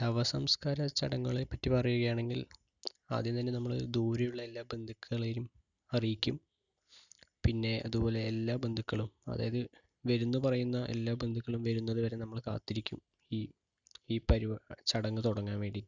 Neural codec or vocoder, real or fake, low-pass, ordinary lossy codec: none; real; 7.2 kHz; none